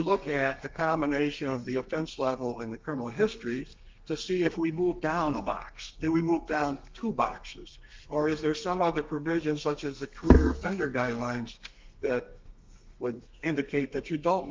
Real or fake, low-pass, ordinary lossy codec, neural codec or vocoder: fake; 7.2 kHz; Opus, 24 kbps; codec, 32 kHz, 1.9 kbps, SNAC